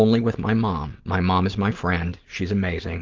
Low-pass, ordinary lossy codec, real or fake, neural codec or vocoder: 7.2 kHz; Opus, 16 kbps; real; none